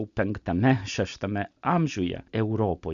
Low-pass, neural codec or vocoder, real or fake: 7.2 kHz; none; real